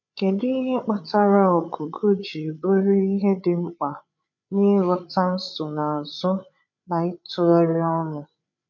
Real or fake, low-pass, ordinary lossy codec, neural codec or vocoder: fake; 7.2 kHz; none; codec, 16 kHz, 8 kbps, FreqCodec, larger model